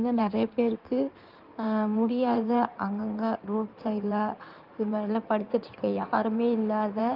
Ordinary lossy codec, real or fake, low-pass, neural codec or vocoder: Opus, 32 kbps; fake; 5.4 kHz; codec, 16 kHz in and 24 kHz out, 2.2 kbps, FireRedTTS-2 codec